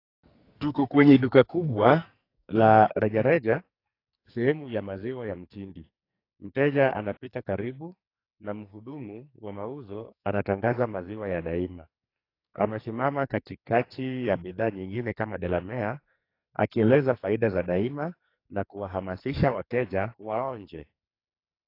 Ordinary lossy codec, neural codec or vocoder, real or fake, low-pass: AAC, 32 kbps; codec, 44.1 kHz, 2.6 kbps, SNAC; fake; 5.4 kHz